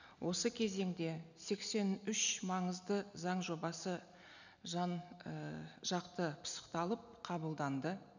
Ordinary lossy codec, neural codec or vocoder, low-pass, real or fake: none; none; 7.2 kHz; real